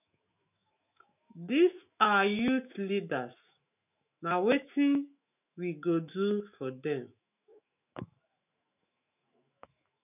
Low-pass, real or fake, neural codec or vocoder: 3.6 kHz; fake; autoencoder, 48 kHz, 128 numbers a frame, DAC-VAE, trained on Japanese speech